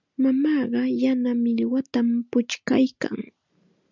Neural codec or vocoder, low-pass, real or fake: none; 7.2 kHz; real